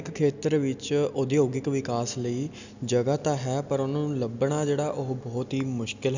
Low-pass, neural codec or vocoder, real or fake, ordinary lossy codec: 7.2 kHz; none; real; none